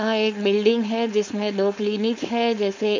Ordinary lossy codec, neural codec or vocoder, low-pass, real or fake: MP3, 48 kbps; codec, 16 kHz, 4.8 kbps, FACodec; 7.2 kHz; fake